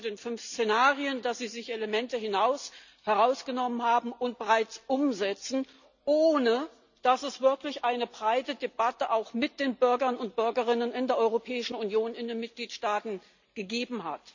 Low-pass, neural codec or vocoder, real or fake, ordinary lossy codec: 7.2 kHz; none; real; none